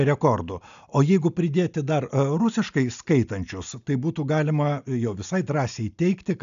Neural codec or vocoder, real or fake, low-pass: none; real; 7.2 kHz